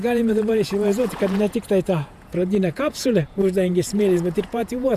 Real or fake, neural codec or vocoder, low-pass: fake; vocoder, 48 kHz, 128 mel bands, Vocos; 14.4 kHz